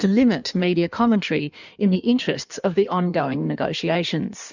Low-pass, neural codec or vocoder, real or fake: 7.2 kHz; codec, 16 kHz in and 24 kHz out, 1.1 kbps, FireRedTTS-2 codec; fake